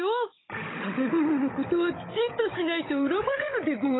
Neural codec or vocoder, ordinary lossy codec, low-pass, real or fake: codec, 16 kHz, 16 kbps, FunCodec, trained on Chinese and English, 50 frames a second; AAC, 16 kbps; 7.2 kHz; fake